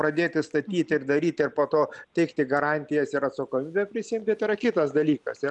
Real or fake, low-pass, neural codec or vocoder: real; 10.8 kHz; none